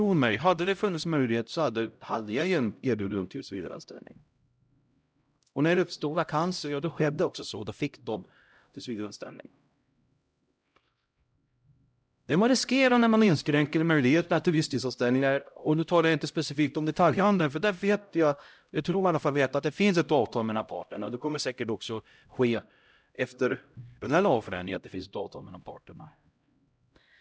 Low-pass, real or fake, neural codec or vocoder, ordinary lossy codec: none; fake; codec, 16 kHz, 0.5 kbps, X-Codec, HuBERT features, trained on LibriSpeech; none